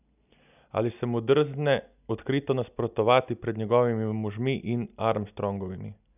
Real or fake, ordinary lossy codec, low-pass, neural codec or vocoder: real; none; 3.6 kHz; none